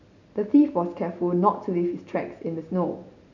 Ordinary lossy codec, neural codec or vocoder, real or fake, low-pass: none; none; real; 7.2 kHz